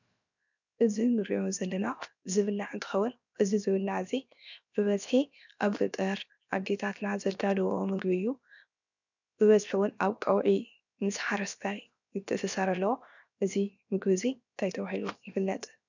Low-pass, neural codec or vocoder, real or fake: 7.2 kHz; codec, 16 kHz, 0.7 kbps, FocalCodec; fake